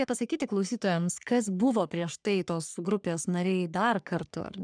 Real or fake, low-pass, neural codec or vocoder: fake; 9.9 kHz; codec, 44.1 kHz, 3.4 kbps, Pupu-Codec